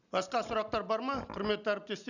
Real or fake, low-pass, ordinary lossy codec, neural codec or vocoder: real; 7.2 kHz; none; none